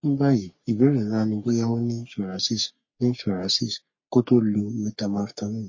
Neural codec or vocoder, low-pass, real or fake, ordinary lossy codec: codec, 44.1 kHz, 3.4 kbps, Pupu-Codec; 7.2 kHz; fake; MP3, 32 kbps